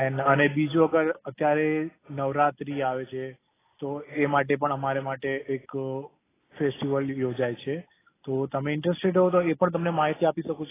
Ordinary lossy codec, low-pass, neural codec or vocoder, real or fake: AAC, 16 kbps; 3.6 kHz; none; real